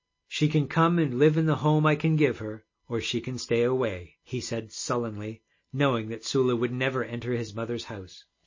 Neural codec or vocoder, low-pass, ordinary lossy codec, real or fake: none; 7.2 kHz; MP3, 32 kbps; real